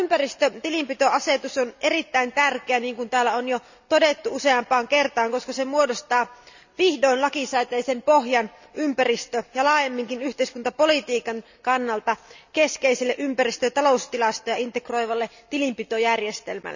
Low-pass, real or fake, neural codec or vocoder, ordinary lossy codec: 7.2 kHz; real; none; none